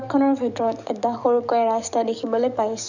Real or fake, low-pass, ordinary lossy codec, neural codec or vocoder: fake; 7.2 kHz; none; codec, 44.1 kHz, 7.8 kbps, DAC